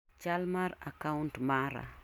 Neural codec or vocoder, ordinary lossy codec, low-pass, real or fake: none; none; 19.8 kHz; real